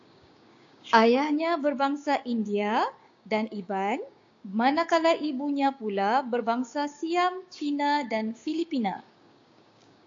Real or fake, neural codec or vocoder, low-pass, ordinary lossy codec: fake; codec, 16 kHz, 6 kbps, DAC; 7.2 kHz; AAC, 48 kbps